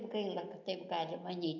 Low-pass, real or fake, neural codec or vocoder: 7.2 kHz; real; none